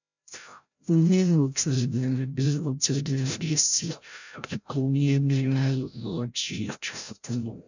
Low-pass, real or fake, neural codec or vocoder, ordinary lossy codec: 7.2 kHz; fake; codec, 16 kHz, 0.5 kbps, FreqCodec, larger model; none